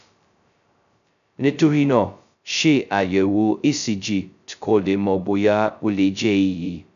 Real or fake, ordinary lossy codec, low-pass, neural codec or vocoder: fake; none; 7.2 kHz; codec, 16 kHz, 0.2 kbps, FocalCodec